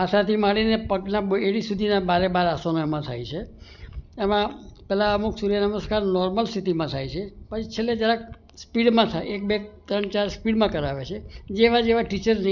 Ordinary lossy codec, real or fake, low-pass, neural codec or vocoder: none; real; 7.2 kHz; none